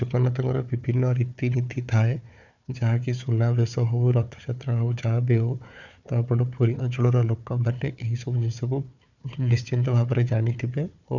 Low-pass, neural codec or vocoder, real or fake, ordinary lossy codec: 7.2 kHz; codec, 16 kHz, 4 kbps, FunCodec, trained on Chinese and English, 50 frames a second; fake; none